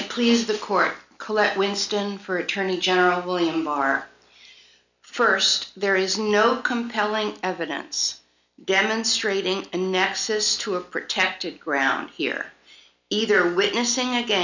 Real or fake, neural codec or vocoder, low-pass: real; none; 7.2 kHz